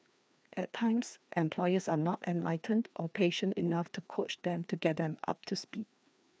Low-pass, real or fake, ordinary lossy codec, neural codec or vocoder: none; fake; none; codec, 16 kHz, 2 kbps, FreqCodec, larger model